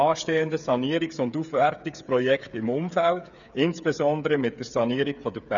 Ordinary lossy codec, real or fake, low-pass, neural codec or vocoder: none; fake; 7.2 kHz; codec, 16 kHz, 8 kbps, FreqCodec, smaller model